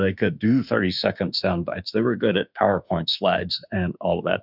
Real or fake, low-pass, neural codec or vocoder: fake; 5.4 kHz; autoencoder, 48 kHz, 32 numbers a frame, DAC-VAE, trained on Japanese speech